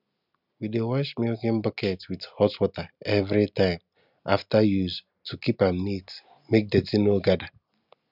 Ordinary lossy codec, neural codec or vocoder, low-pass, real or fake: none; none; 5.4 kHz; real